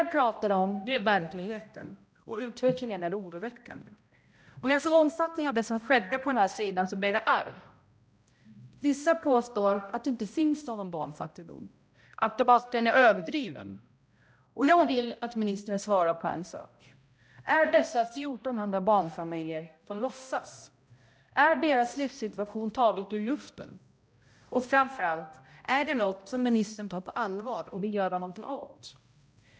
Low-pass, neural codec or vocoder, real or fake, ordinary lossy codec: none; codec, 16 kHz, 0.5 kbps, X-Codec, HuBERT features, trained on balanced general audio; fake; none